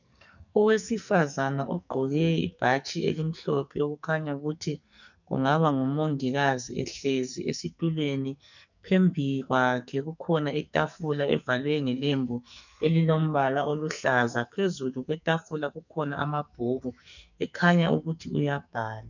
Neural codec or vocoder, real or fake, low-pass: codec, 44.1 kHz, 2.6 kbps, SNAC; fake; 7.2 kHz